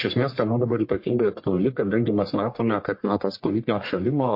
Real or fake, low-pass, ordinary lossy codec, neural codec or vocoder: fake; 5.4 kHz; MP3, 32 kbps; codec, 44.1 kHz, 1.7 kbps, Pupu-Codec